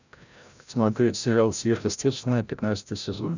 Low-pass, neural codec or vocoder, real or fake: 7.2 kHz; codec, 16 kHz, 0.5 kbps, FreqCodec, larger model; fake